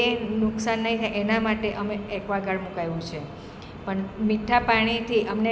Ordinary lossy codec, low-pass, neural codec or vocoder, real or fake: none; none; none; real